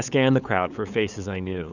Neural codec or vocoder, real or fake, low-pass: codec, 16 kHz, 8 kbps, FunCodec, trained on LibriTTS, 25 frames a second; fake; 7.2 kHz